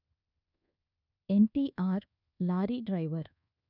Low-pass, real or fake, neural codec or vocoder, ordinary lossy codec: 5.4 kHz; fake; codec, 24 kHz, 1.2 kbps, DualCodec; none